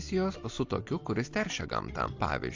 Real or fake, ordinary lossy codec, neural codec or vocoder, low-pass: real; AAC, 48 kbps; none; 7.2 kHz